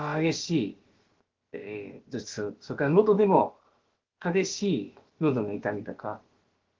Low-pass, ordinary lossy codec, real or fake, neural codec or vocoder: 7.2 kHz; Opus, 16 kbps; fake; codec, 16 kHz, about 1 kbps, DyCAST, with the encoder's durations